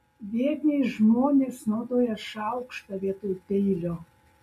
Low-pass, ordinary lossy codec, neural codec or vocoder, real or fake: 14.4 kHz; MP3, 64 kbps; none; real